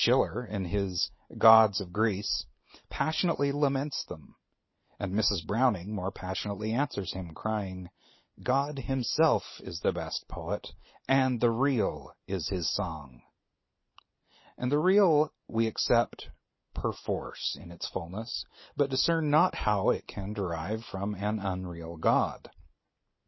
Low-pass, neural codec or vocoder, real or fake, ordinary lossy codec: 7.2 kHz; none; real; MP3, 24 kbps